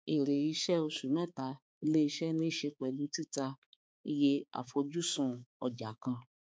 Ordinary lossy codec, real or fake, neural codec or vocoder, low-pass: none; fake; codec, 16 kHz, 4 kbps, X-Codec, HuBERT features, trained on balanced general audio; none